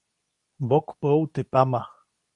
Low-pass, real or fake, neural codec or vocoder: 10.8 kHz; fake; codec, 24 kHz, 0.9 kbps, WavTokenizer, medium speech release version 2